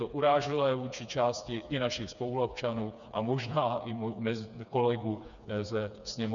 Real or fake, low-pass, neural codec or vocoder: fake; 7.2 kHz; codec, 16 kHz, 4 kbps, FreqCodec, smaller model